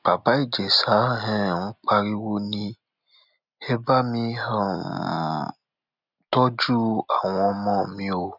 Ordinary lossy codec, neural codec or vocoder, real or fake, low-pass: none; none; real; 5.4 kHz